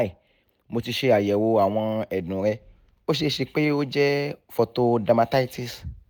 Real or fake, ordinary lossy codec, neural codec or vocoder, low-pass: real; none; none; none